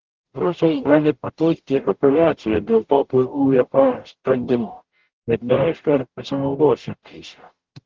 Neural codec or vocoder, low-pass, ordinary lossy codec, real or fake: codec, 44.1 kHz, 0.9 kbps, DAC; 7.2 kHz; Opus, 16 kbps; fake